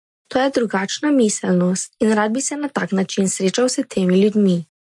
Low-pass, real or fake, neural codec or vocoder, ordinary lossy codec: 10.8 kHz; real; none; MP3, 48 kbps